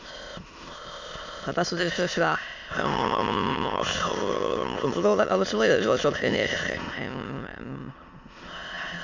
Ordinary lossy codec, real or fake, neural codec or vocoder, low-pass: MP3, 64 kbps; fake; autoencoder, 22.05 kHz, a latent of 192 numbers a frame, VITS, trained on many speakers; 7.2 kHz